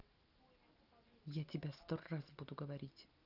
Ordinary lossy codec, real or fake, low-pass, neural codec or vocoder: Opus, 32 kbps; real; 5.4 kHz; none